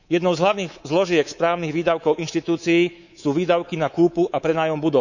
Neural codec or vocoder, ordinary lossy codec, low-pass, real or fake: codec, 24 kHz, 3.1 kbps, DualCodec; none; 7.2 kHz; fake